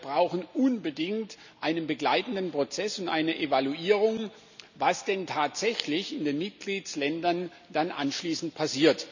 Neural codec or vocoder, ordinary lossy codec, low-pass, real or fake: none; none; 7.2 kHz; real